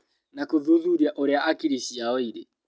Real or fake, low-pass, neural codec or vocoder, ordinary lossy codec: real; none; none; none